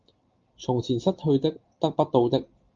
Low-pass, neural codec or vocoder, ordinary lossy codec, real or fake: 7.2 kHz; none; Opus, 24 kbps; real